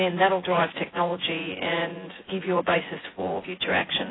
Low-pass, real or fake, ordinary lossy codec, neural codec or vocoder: 7.2 kHz; fake; AAC, 16 kbps; vocoder, 24 kHz, 100 mel bands, Vocos